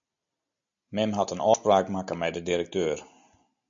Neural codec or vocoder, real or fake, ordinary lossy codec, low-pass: none; real; MP3, 64 kbps; 7.2 kHz